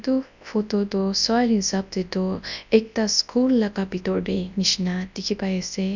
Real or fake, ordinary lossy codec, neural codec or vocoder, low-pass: fake; none; codec, 24 kHz, 0.9 kbps, WavTokenizer, large speech release; 7.2 kHz